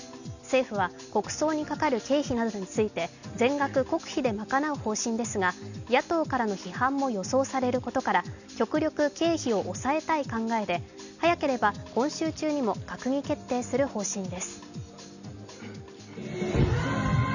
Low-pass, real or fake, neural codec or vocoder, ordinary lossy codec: 7.2 kHz; real; none; none